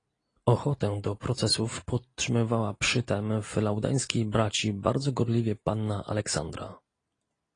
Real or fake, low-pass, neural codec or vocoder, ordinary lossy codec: real; 9.9 kHz; none; AAC, 32 kbps